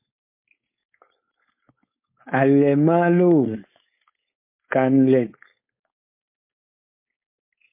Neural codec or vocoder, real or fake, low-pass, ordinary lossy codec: codec, 16 kHz, 4.8 kbps, FACodec; fake; 3.6 kHz; MP3, 24 kbps